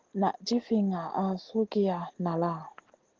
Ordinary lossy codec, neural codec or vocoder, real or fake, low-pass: Opus, 16 kbps; none; real; 7.2 kHz